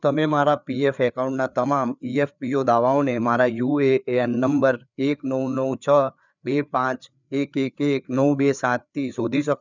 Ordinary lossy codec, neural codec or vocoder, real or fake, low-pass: none; codec, 16 kHz, 4 kbps, FreqCodec, larger model; fake; 7.2 kHz